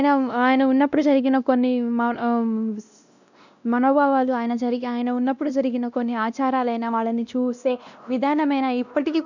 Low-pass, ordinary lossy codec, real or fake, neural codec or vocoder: 7.2 kHz; none; fake; codec, 16 kHz, 1 kbps, X-Codec, WavLM features, trained on Multilingual LibriSpeech